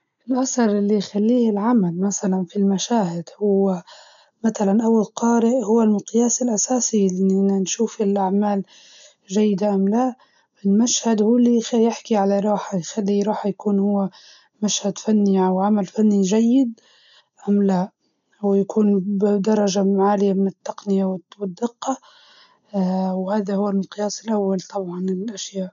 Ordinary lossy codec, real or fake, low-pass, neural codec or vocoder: none; real; 7.2 kHz; none